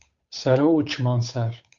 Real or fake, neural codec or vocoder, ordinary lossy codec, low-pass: fake; codec, 16 kHz, 8 kbps, FunCodec, trained on Chinese and English, 25 frames a second; Opus, 64 kbps; 7.2 kHz